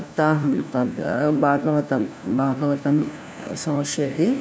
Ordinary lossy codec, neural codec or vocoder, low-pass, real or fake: none; codec, 16 kHz, 1 kbps, FunCodec, trained on LibriTTS, 50 frames a second; none; fake